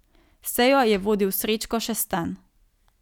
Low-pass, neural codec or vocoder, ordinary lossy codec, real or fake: 19.8 kHz; none; none; real